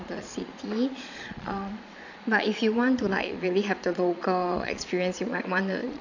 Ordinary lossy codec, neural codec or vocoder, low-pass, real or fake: none; vocoder, 22.05 kHz, 80 mel bands, Vocos; 7.2 kHz; fake